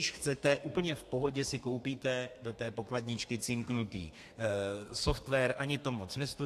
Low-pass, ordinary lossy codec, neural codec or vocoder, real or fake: 14.4 kHz; AAC, 64 kbps; codec, 32 kHz, 1.9 kbps, SNAC; fake